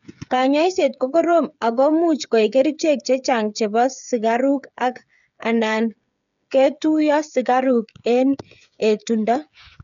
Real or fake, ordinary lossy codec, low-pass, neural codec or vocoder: fake; none; 7.2 kHz; codec, 16 kHz, 8 kbps, FreqCodec, smaller model